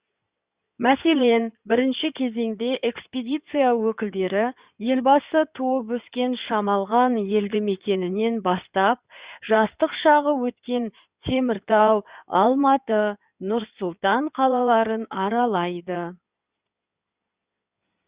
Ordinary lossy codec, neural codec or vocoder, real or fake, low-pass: Opus, 64 kbps; codec, 16 kHz in and 24 kHz out, 2.2 kbps, FireRedTTS-2 codec; fake; 3.6 kHz